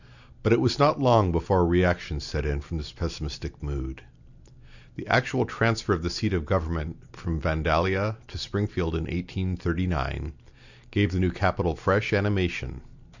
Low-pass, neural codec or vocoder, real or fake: 7.2 kHz; none; real